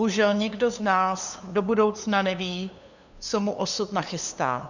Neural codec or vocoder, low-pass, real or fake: codec, 16 kHz, 2 kbps, FunCodec, trained on LibriTTS, 25 frames a second; 7.2 kHz; fake